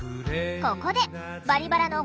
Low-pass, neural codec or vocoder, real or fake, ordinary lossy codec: none; none; real; none